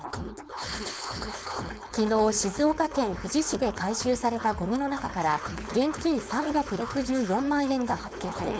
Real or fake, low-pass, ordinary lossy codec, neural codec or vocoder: fake; none; none; codec, 16 kHz, 4.8 kbps, FACodec